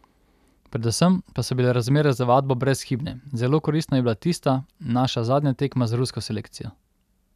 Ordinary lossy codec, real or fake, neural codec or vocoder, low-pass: none; real; none; 14.4 kHz